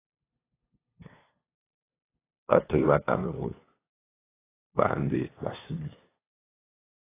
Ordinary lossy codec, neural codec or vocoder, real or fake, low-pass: AAC, 16 kbps; codec, 16 kHz, 2 kbps, FunCodec, trained on LibriTTS, 25 frames a second; fake; 3.6 kHz